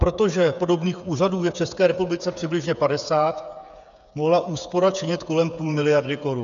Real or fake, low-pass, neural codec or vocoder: fake; 7.2 kHz; codec, 16 kHz, 8 kbps, FreqCodec, smaller model